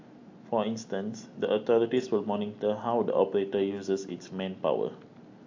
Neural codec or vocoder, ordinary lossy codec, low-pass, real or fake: none; AAC, 48 kbps; 7.2 kHz; real